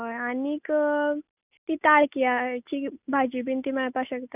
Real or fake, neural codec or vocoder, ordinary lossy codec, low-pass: real; none; none; 3.6 kHz